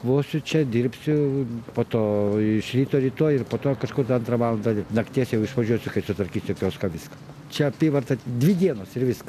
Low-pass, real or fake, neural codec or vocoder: 14.4 kHz; real; none